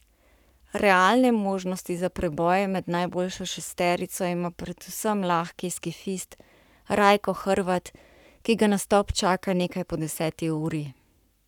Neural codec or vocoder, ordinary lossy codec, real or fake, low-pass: codec, 44.1 kHz, 7.8 kbps, Pupu-Codec; none; fake; 19.8 kHz